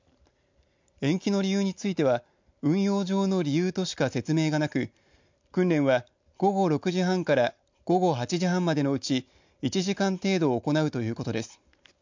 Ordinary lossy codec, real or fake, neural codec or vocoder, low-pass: MP3, 64 kbps; real; none; 7.2 kHz